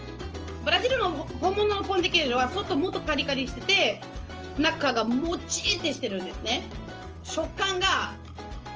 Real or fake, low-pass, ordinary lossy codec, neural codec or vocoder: real; 7.2 kHz; Opus, 24 kbps; none